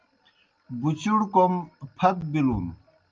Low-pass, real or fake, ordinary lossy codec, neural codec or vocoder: 7.2 kHz; real; Opus, 24 kbps; none